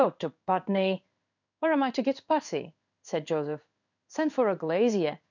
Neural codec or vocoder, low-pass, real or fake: codec, 16 kHz in and 24 kHz out, 1 kbps, XY-Tokenizer; 7.2 kHz; fake